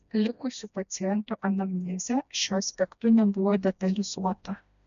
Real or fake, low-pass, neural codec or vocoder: fake; 7.2 kHz; codec, 16 kHz, 1 kbps, FreqCodec, smaller model